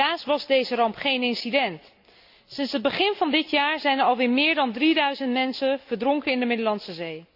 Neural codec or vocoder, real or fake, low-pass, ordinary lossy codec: none; real; 5.4 kHz; AAC, 48 kbps